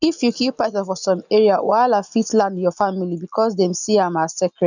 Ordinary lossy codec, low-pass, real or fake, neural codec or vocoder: none; 7.2 kHz; real; none